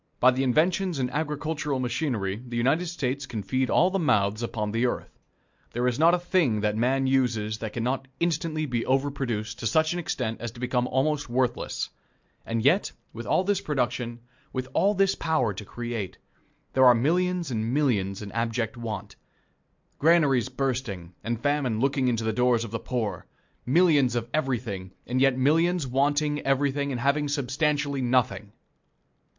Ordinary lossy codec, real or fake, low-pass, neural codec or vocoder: MP3, 64 kbps; real; 7.2 kHz; none